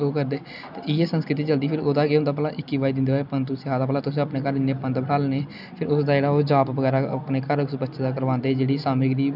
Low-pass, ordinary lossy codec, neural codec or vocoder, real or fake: 5.4 kHz; none; none; real